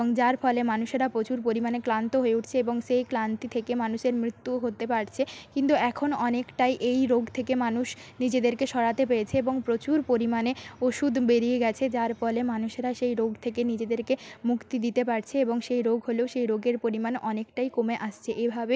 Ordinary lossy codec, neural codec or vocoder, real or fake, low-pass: none; none; real; none